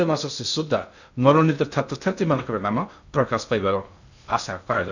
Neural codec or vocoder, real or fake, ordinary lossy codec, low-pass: codec, 16 kHz in and 24 kHz out, 0.6 kbps, FocalCodec, streaming, 2048 codes; fake; none; 7.2 kHz